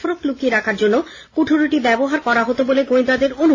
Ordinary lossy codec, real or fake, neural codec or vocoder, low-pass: AAC, 32 kbps; real; none; 7.2 kHz